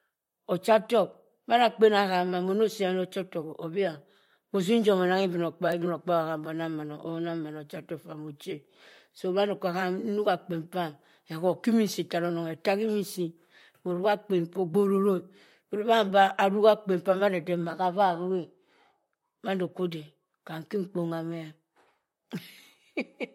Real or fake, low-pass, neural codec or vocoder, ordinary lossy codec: fake; 19.8 kHz; vocoder, 44.1 kHz, 128 mel bands, Pupu-Vocoder; MP3, 64 kbps